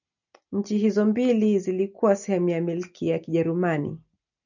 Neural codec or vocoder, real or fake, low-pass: none; real; 7.2 kHz